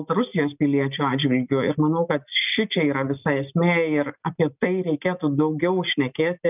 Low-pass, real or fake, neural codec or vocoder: 3.6 kHz; real; none